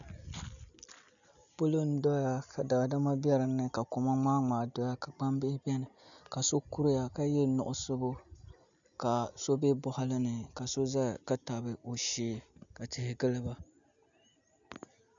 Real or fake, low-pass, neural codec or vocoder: real; 7.2 kHz; none